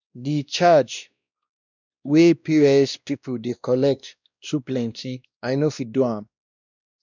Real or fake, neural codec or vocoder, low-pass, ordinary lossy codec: fake; codec, 16 kHz, 1 kbps, X-Codec, WavLM features, trained on Multilingual LibriSpeech; 7.2 kHz; none